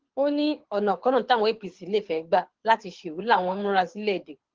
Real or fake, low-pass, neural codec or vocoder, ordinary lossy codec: fake; 7.2 kHz; codec, 24 kHz, 6 kbps, HILCodec; Opus, 16 kbps